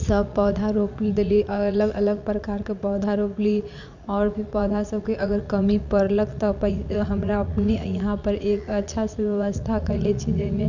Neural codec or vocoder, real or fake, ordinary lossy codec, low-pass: vocoder, 44.1 kHz, 80 mel bands, Vocos; fake; none; 7.2 kHz